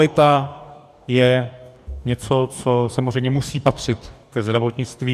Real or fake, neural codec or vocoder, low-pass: fake; codec, 44.1 kHz, 2.6 kbps, DAC; 14.4 kHz